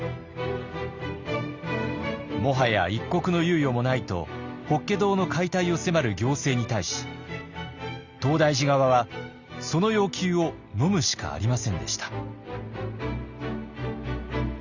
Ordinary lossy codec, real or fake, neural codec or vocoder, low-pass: Opus, 64 kbps; real; none; 7.2 kHz